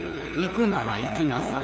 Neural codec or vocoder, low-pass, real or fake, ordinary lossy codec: codec, 16 kHz, 2 kbps, FunCodec, trained on LibriTTS, 25 frames a second; none; fake; none